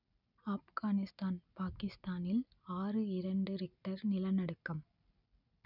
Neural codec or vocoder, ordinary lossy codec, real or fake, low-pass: none; none; real; 5.4 kHz